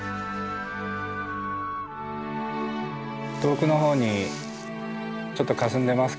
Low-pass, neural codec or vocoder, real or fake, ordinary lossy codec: none; none; real; none